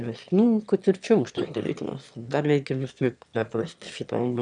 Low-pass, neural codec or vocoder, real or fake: 9.9 kHz; autoencoder, 22.05 kHz, a latent of 192 numbers a frame, VITS, trained on one speaker; fake